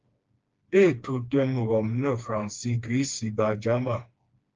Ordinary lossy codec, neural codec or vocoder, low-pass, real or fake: Opus, 24 kbps; codec, 16 kHz, 2 kbps, FreqCodec, smaller model; 7.2 kHz; fake